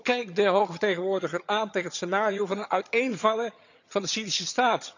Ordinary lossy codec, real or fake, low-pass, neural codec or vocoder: none; fake; 7.2 kHz; vocoder, 22.05 kHz, 80 mel bands, HiFi-GAN